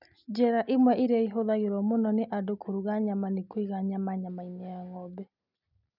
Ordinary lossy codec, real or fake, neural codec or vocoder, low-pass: none; real; none; 5.4 kHz